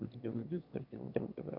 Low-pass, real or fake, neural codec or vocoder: 5.4 kHz; fake; autoencoder, 22.05 kHz, a latent of 192 numbers a frame, VITS, trained on one speaker